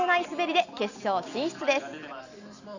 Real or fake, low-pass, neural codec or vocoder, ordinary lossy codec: real; 7.2 kHz; none; none